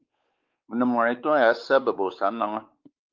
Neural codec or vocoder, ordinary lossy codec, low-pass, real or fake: codec, 16 kHz, 4 kbps, X-Codec, WavLM features, trained on Multilingual LibriSpeech; Opus, 32 kbps; 7.2 kHz; fake